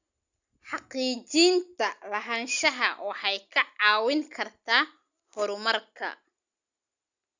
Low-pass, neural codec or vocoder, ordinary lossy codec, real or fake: 7.2 kHz; none; Opus, 64 kbps; real